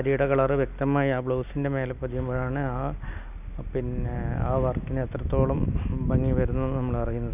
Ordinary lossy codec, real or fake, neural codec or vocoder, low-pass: none; real; none; 3.6 kHz